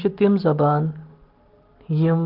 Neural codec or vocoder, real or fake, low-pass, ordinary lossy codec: none; real; 5.4 kHz; Opus, 16 kbps